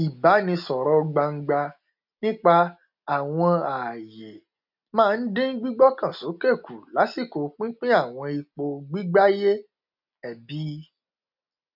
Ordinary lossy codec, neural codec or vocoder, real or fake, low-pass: none; none; real; 5.4 kHz